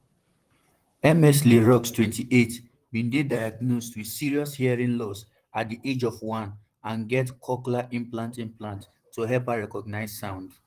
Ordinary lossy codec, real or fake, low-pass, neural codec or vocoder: Opus, 24 kbps; fake; 14.4 kHz; vocoder, 44.1 kHz, 128 mel bands, Pupu-Vocoder